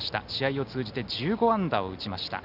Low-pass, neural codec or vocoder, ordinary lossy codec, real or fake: 5.4 kHz; none; none; real